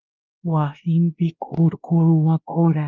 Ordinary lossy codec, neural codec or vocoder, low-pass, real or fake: Opus, 32 kbps; codec, 16 kHz, 1 kbps, X-Codec, WavLM features, trained on Multilingual LibriSpeech; 7.2 kHz; fake